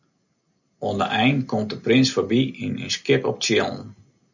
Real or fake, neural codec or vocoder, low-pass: real; none; 7.2 kHz